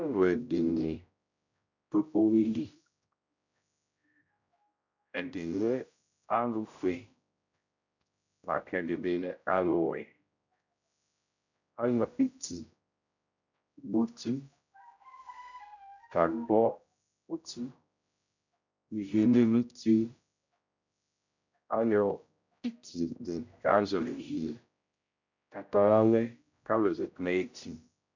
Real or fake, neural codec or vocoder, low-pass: fake; codec, 16 kHz, 0.5 kbps, X-Codec, HuBERT features, trained on general audio; 7.2 kHz